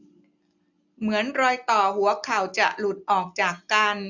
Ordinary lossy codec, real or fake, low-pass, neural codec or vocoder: none; real; 7.2 kHz; none